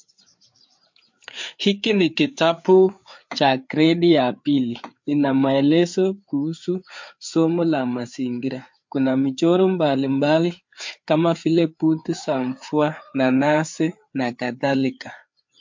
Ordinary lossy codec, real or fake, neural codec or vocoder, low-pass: MP3, 48 kbps; fake; codec, 16 kHz, 4 kbps, FreqCodec, larger model; 7.2 kHz